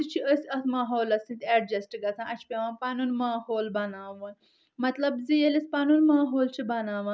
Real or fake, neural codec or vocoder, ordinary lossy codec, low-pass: real; none; none; none